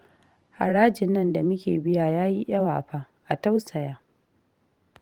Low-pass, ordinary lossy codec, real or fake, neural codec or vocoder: 19.8 kHz; Opus, 24 kbps; fake; vocoder, 44.1 kHz, 128 mel bands every 512 samples, BigVGAN v2